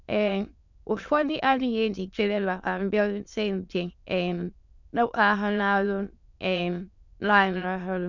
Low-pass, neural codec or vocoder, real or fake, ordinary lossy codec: 7.2 kHz; autoencoder, 22.05 kHz, a latent of 192 numbers a frame, VITS, trained on many speakers; fake; none